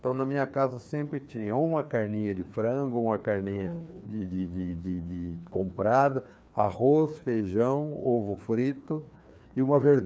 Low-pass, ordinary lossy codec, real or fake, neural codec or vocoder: none; none; fake; codec, 16 kHz, 2 kbps, FreqCodec, larger model